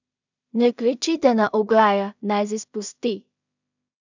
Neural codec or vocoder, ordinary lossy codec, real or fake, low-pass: codec, 16 kHz in and 24 kHz out, 0.4 kbps, LongCat-Audio-Codec, two codebook decoder; none; fake; 7.2 kHz